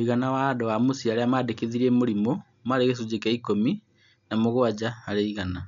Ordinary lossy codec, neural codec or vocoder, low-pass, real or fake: none; none; 7.2 kHz; real